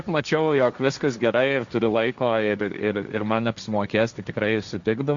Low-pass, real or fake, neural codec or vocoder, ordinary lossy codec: 7.2 kHz; fake; codec, 16 kHz, 1.1 kbps, Voila-Tokenizer; Opus, 64 kbps